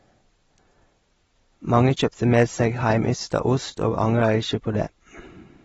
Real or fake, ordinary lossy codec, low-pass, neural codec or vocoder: real; AAC, 24 kbps; 10.8 kHz; none